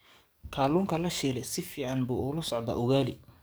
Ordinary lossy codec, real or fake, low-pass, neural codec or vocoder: none; fake; none; codec, 44.1 kHz, 7.8 kbps, DAC